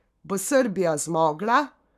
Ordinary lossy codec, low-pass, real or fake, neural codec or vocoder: none; 14.4 kHz; fake; codec, 44.1 kHz, 7.8 kbps, Pupu-Codec